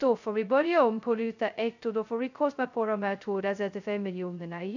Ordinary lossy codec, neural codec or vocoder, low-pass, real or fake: none; codec, 16 kHz, 0.2 kbps, FocalCodec; 7.2 kHz; fake